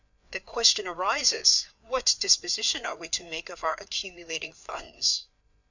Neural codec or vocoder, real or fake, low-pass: codec, 16 kHz, 4 kbps, FreqCodec, larger model; fake; 7.2 kHz